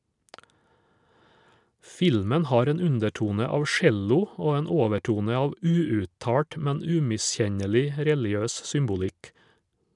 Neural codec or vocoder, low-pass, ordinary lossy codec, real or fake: none; 10.8 kHz; none; real